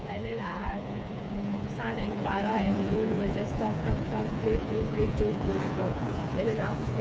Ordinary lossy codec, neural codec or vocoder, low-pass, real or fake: none; codec, 16 kHz, 4 kbps, FreqCodec, smaller model; none; fake